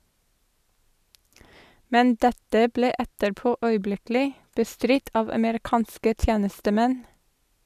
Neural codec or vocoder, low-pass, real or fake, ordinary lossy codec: none; 14.4 kHz; real; none